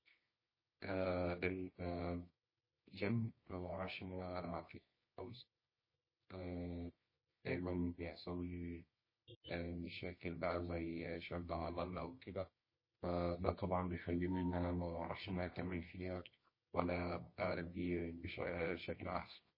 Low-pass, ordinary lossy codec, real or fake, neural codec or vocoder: 5.4 kHz; MP3, 24 kbps; fake; codec, 24 kHz, 0.9 kbps, WavTokenizer, medium music audio release